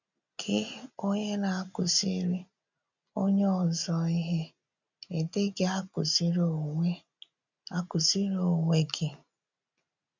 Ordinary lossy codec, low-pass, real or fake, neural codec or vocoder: none; 7.2 kHz; real; none